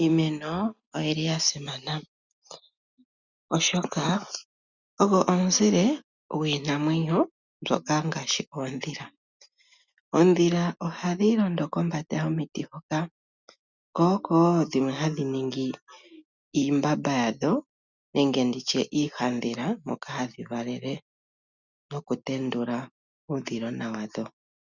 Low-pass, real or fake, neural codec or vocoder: 7.2 kHz; real; none